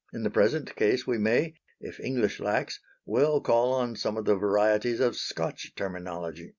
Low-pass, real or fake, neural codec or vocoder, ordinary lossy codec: 7.2 kHz; real; none; Opus, 64 kbps